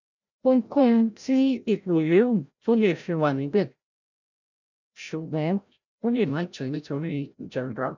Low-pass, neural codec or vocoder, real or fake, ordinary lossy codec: 7.2 kHz; codec, 16 kHz, 0.5 kbps, FreqCodec, larger model; fake; none